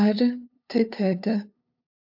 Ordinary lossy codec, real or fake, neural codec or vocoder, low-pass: AAC, 48 kbps; fake; codec, 16 kHz, 2 kbps, FunCodec, trained on LibriTTS, 25 frames a second; 5.4 kHz